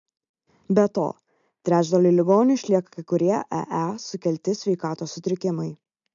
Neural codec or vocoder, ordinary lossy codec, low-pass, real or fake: none; MP3, 64 kbps; 7.2 kHz; real